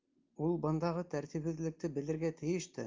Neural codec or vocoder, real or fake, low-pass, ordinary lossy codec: none; real; 7.2 kHz; Opus, 24 kbps